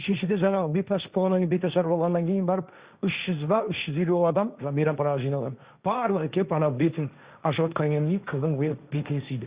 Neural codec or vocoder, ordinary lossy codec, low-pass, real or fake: codec, 16 kHz, 1.1 kbps, Voila-Tokenizer; Opus, 64 kbps; 3.6 kHz; fake